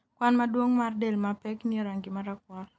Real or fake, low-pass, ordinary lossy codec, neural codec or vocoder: real; none; none; none